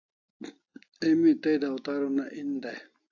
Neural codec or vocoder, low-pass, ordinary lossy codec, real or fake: none; 7.2 kHz; AAC, 48 kbps; real